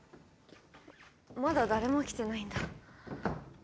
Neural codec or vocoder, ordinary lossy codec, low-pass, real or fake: none; none; none; real